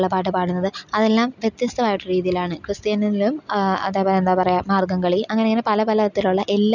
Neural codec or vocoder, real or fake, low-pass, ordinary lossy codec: none; real; 7.2 kHz; none